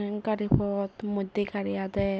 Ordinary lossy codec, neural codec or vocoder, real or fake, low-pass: none; none; real; none